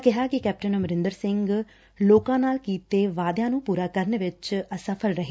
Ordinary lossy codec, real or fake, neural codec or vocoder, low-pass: none; real; none; none